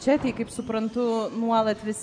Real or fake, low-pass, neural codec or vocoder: real; 9.9 kHz; none